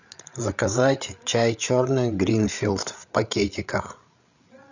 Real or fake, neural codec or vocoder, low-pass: fake; codec, 16 kHz, 8 kbps, FreqCodec, larger model; 7.2 kHz